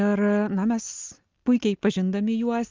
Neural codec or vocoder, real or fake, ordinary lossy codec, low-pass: none; real; Opus, 32 kbps; 7.2 kHz